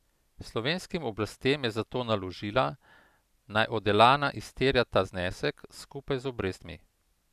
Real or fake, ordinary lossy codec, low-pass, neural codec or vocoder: real; none; 14.4 kHz; none